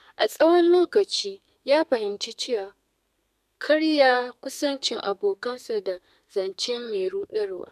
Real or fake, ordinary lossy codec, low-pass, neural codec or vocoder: fake; none; 14.4 kHz; codec, 32 kHz, 1.9 kbps, SNAC